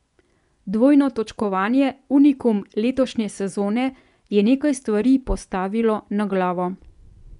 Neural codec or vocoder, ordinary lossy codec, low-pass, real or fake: vocoder, 24 kHz, 100 mel bands, Vocos; none; 10.8 kHz; fake